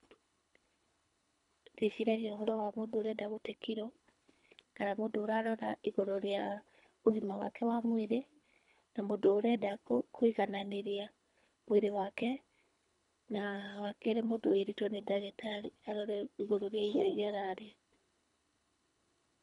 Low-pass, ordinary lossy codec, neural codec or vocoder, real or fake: 10.8 kHz; none; codec, 24 kHz, 3 kbps, HILCodec; fake